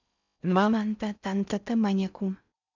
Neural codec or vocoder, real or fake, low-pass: codec, 16 kHz in and 24 kHz out, 0.6 kbps, FocalCodec, streaming, 4096 codes; fake; 7.2 kHz